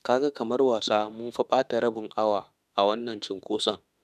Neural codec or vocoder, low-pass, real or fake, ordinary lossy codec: autoencoder, 48 kHz, 32 numbers a frame, DAC-VAE, trained on Japanese speech; 14.4 kHz; fake; none